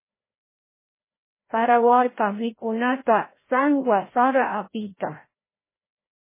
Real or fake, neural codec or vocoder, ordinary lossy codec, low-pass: fake; codec, 16 kHz, 0.5 kbps, FreqCodec, larger model; MP3, 16 kbps; 3.6 kHz